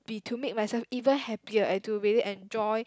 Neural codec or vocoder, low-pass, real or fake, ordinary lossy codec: none; none; real; none